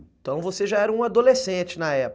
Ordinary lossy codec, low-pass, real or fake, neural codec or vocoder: none; none; real; none